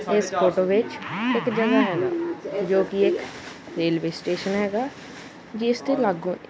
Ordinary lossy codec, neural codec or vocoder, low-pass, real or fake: none; none; none; real